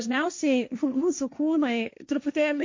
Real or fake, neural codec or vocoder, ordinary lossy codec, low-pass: fake; codec, 16 kHz, 1.1 kbps, Voila-Tokenizer; MP3, 48 kbps; 7.2 kHz